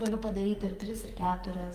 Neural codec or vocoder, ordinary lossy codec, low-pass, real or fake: codec, 44.1 kHz, 2.6 kbps, SNAC; Opus, 32 kbps; 14.4 kHz; fake